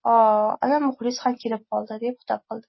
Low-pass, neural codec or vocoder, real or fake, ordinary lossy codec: 7.2 kHz; none; real; MP3, 24 kbps